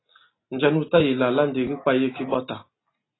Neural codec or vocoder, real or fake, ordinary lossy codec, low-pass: none; real; AAC, 16 kbps; 7.2 kHz